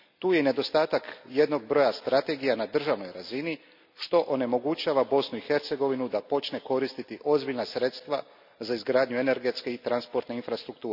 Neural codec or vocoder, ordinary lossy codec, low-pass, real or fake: none; none; 5.4 kHz; real